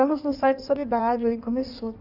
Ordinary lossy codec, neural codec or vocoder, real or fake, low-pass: none; codec, 16 kHz in and 24 kHz out, 1.1 kbps, FireRedTTS-2 codec; fake; 5.4 kHz